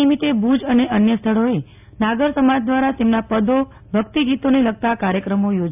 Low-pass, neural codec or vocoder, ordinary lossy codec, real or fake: 3.6 kHz; none; AAC, 32 kbps; real